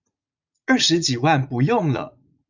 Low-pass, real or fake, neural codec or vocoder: 7.2 kHz; fake; vocoder, 44.1 kHz, 80 mel bands, Vocos